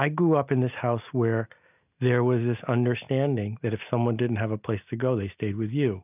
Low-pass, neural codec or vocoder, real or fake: 3.6 kHz; none; real